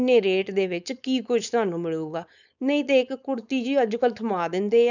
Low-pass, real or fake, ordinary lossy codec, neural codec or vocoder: 7.2 kHz; fake; none; codec, 16 kHz, 4.8 kbps, FACodec